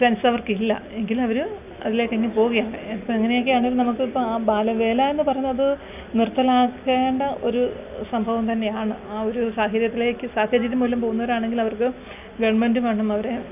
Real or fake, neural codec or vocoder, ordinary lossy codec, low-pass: real; none; none; 3.6 kHz